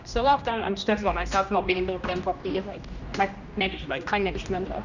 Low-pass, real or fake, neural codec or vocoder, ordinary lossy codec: 7.2 kHz; fake; codec, 16 kHz, 1 kbps, X-Codec, HuBERT features, trained on general audio; none